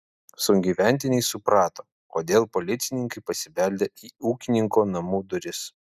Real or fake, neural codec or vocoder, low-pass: real; none; 14.4 kHz